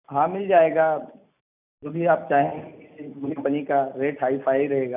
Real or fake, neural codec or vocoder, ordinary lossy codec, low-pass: real; none; none; 3.6 kHz